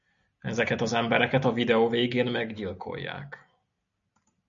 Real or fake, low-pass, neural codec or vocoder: real; 7.2 kHz; none